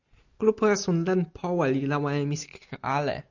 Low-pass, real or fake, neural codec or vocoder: 7.2 kHz; real; none